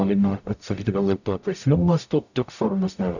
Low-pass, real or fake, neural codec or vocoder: 7.2 kHz; fake; codec, 44.1 kHz, 0.9 kbps, DAC